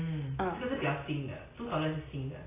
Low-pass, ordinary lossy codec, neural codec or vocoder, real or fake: 3.6 kHz; AAC, 16 kbps; none; real